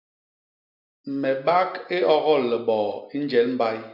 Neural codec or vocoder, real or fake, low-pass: none; real; 5.4 kHz